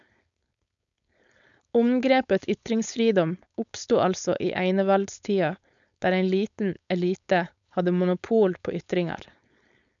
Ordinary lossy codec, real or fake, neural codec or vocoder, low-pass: none; fake; codec, 16 kHz, 4.8 kbps, FACodec; 7.2 kHz